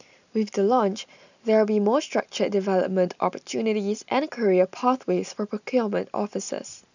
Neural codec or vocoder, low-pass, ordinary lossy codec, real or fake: none; 7.2 kHz; none; real